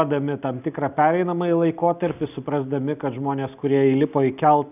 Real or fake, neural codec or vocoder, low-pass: real; none; 3.6 kHz